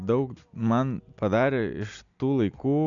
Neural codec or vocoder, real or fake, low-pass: none; real; 7.2 kHz